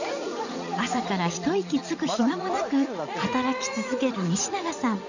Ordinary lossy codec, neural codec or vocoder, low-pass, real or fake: none; vocoder, 44.1 kHz, 80 mel bands, Vocos; 7.2 kHz; fake